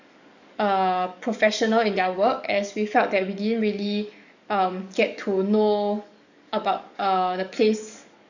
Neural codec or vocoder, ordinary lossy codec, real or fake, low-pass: codec, 44.1 kHz, 7.8 kbps, DAC; none; fake; 7.2 kHz